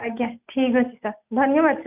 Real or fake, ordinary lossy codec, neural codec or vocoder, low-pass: real; none; none; 3.6 kHz